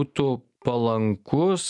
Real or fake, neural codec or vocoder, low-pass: real; none; 10.8 kHz